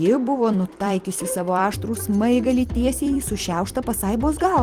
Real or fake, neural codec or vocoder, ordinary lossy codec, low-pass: fake; vocoder, 44.1 kHz, 128 mel bands every 256 samples, BigVGAN v2; Opus, 24 kbps; 14.4 kHz